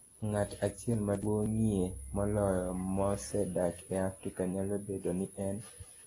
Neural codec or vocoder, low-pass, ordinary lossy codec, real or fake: none; 10.8 kHz; AAC, 32 kbps; real